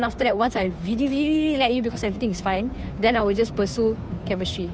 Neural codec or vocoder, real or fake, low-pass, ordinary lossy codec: codec, 16 kHz, 2 kbps, FunCodec, trained on Chinese and English, 25 frames a second; fake; none; none